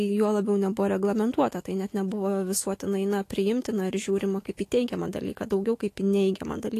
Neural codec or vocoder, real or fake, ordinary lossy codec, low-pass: autoencoder, 48 kHz, 128 numbers a frame, DAC-VAE, trained on Japanese speech; fake; AAC, 48 kbps; 14.4 kHz